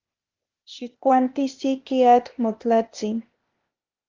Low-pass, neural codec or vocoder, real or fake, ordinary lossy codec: 7.2 kHz; codec, 16 kHz, 0.8 kbps, ZipCodec; fake; Opus, 32 kbps